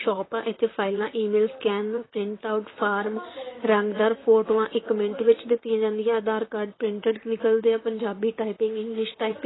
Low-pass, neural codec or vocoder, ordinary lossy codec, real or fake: 7.2 kHz; vocoder, 44.1 kHz, 128 mel bands, Pupu-Vocoder; AAC, 16 kbps; fake